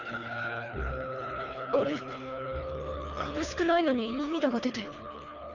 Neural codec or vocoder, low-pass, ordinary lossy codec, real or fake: codec, 24 kHz, 3 kbps, HILCodec; 7.2 kHz; none; fake